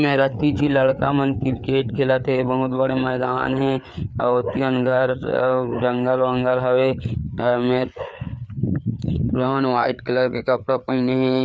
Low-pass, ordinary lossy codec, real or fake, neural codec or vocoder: none; none; fake; codec, 16 kHz, 4 kbps, FreqCodec, larger model